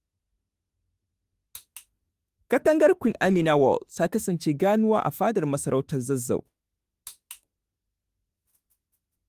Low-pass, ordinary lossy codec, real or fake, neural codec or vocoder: 14.4 kHz; Opus, 32 kbps; fake; autoencoder, 48 kHz, 32 numbers a frame, DAC-VAE, trained on Japanese speech